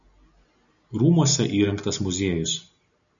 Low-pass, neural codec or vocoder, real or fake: 7.2 kHz; none; real